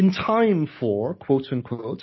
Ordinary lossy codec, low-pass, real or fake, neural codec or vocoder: MP3, 24 kbps; 7.2 kHz; real; none